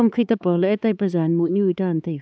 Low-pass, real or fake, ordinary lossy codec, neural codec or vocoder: none; fake; none; codec, 16 kHz, 4 kbps, X-Codec, HuBERT features, trained on LibriSpeech